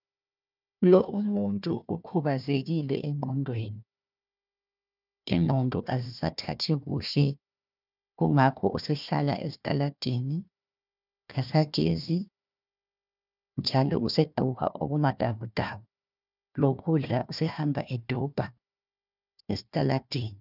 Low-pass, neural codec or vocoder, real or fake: 5.4 kHz; codec, 16 kHz, 1 kbps, FunCodec, trained on Chinese and English, 50 frames a second; fake